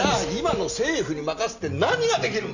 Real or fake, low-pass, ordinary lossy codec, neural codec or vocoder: real; 7.2 kHz; none; none